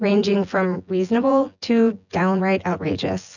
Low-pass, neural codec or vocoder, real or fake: 7.2 kHz; vocoder, 24 kHz, 100 mel bands, Vocos; fake